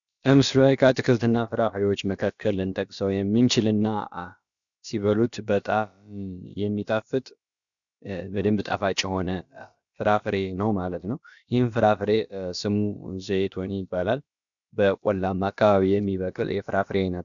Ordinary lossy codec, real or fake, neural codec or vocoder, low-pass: MP3, 96 kbps; fake; codec, 16 kHz, about 1 kbps, DyCAST, with the encoder's durations; 7.2 kHz